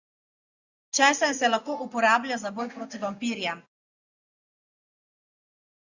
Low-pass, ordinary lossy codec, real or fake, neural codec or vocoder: 7.2 kHz; Opus, 64 kbps; real; none